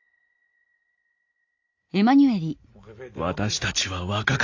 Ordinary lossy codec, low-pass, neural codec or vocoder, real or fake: AAC, 48 kbps; 7.2 kHz; none; real